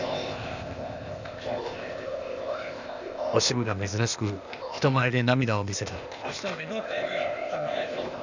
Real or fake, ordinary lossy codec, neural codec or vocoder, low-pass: fake; none; codec, 16 kHz, 0.8 kbps, ZipCodec; 7.2 kHz